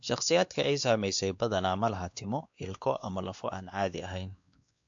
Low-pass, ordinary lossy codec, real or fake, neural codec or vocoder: 7.2 kHz; AAC, 64 kbps; fake; codec, 16 kHz, 2 kbps, X-Codec, WavLM features, trained on Multilingual LibriSpeech